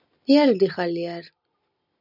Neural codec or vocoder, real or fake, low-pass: none; real; 5.4 kHz